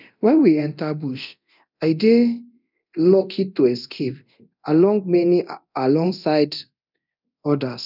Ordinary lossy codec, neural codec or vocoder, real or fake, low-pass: none; codec, 24 kHz, 0.9 kbps, DualCodec; fake; 5.4 kHz